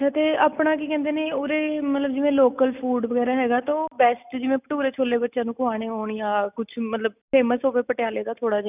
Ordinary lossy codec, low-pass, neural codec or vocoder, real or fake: none; 3.6 kHz; none; real